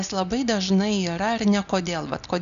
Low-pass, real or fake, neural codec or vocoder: 7.2 kHz; real; none